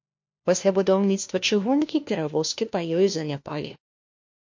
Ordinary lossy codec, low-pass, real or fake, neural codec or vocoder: MP3, 48 kbps; 7.2 kHz; fake; codec, 16 kHz, 1 kbps, FunCodec, trained on LibriTTS, 50 frames a second